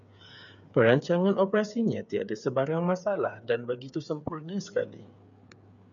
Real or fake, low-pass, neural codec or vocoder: fake; 7.2 kHz; codec, 16 kHz, 8 kbps, FreqCodec, smaller model